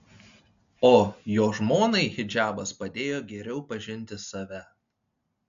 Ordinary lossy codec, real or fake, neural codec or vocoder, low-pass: AAC, 64 kbps; real; none; 7.2 kHz